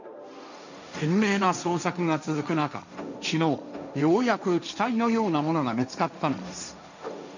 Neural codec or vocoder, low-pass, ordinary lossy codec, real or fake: codec, 16 kHz, 1.1 kbps, Voila-Tokenizer; 7.2 kHz; none; fake